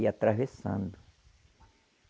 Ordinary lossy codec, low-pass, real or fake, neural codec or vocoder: none; none; real; none